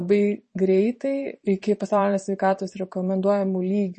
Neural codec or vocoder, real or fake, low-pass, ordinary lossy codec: vocoder, 44.1 kHz, 128 mel bands every 512 samples, BigVGAN v2; fake; 10.8 kHz; MP3, 32 kbps